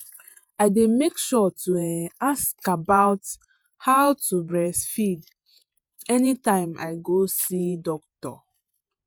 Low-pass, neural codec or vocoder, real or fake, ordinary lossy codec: none; vocoder, 48 kHz, 128 mel bands, Vocos; fake; none